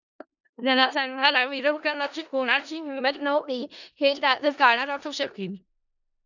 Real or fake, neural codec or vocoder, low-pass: fake; codec, 16 kHz in and 24 kHz out, 0.4 kbps, LongCat-Audio-Codec, four codebook decoder; 7.2 kHz